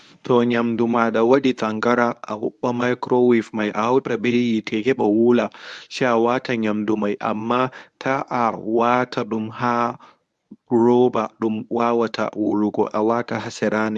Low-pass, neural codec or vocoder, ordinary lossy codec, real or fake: none; codec, 24 kHz, 0.9 kbps, WavTokenizer, medium speech release version 1; none; fake